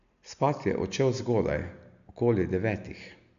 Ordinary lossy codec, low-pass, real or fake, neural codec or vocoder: none; 7.2 kHz; real; none